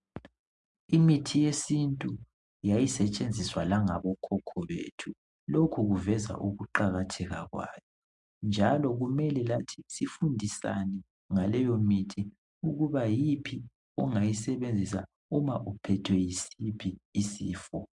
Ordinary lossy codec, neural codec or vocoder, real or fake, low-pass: MP3, 64 kbps; none; real; 10.8 kHz